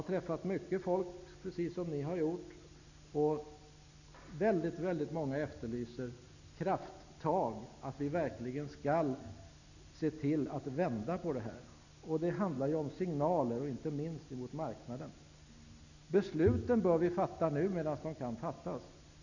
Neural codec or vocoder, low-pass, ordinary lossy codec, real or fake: none; 7.2 kHz; none; real